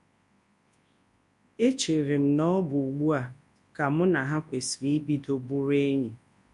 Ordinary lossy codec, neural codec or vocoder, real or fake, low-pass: MP3, 48 kbps; codec, 24 kHz, 0.9 kbps, WavTokenizer, large speech release; fake; 10.8 kHz